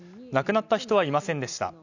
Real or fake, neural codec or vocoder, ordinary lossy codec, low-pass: real; none; none; 7.2 kHz